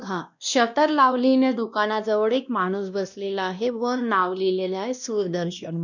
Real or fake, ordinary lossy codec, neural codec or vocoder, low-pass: fake; none; codec, 16 kHz, 1 kbps, X-Codec, WavLM features, trained on Multilingual LibriSpeech; 7.2 kHz